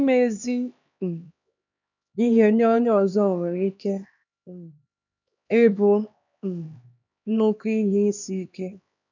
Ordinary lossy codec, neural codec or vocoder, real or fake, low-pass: none; codec, 16 kHz, 2 kbps, X-Codec, HuBERT features, trained on LibriSpeech; fake; 7.2 kHz